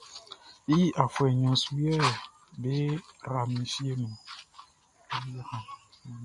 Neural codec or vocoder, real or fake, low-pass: none; real; 10.8 kHz